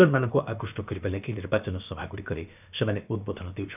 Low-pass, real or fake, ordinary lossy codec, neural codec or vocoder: 3.6 kHz; fake; none; codec, 16 kHz, about 1 kbps, DyCAST, with the encoder's durations